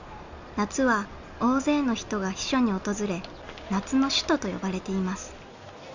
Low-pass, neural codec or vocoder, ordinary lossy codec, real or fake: 7.2 kHz; none; Opus, 64 kbps; real